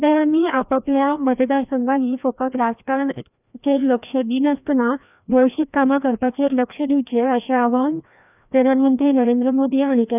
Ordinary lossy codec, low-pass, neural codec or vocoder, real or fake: none; 3.6 kHz; codec, 16 kHz, 1 kbps, FreqCodec, larger model; fake